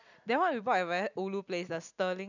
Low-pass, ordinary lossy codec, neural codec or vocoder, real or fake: 7.2 kHz; none; none; real